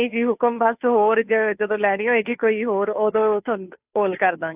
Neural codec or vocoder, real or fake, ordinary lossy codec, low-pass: codec, 16 kHz, 2 kbps, FunCodec, trained on Chinese and English, 25 frames a second; fake; AAC, 32 kbps; 3.6 kHz